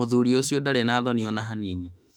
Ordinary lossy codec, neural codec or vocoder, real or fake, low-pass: none; autoencoder, 48 kHz, 32 numbers a frame, DAC-VAE, trained on Japanese speech; fake; 19.8 kHz